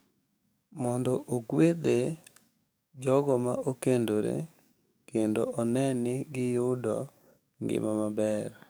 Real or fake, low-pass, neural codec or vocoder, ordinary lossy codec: fake; none; codec, 44.1 kHz, 7.8 kbps, DAC; none